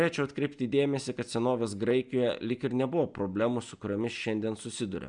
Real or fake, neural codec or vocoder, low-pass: real; none; 9.9 kHz